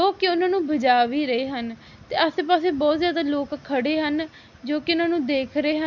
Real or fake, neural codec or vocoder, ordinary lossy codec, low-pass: real; none; none; 7.2 kHz